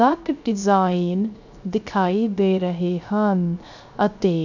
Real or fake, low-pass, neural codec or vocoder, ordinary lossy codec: fake; 7.2 kHz; codec, 16 kHz, 0.3 kbps, FocalCodec; none